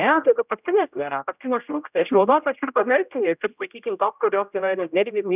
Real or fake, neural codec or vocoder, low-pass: fake; codec, 16 kHz, 0.5 kbps, X-Codec, HuBERT features, trained on general audio; 3.6 kHz